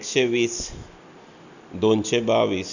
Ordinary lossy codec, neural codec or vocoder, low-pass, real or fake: none; none; 7.2 kHz; real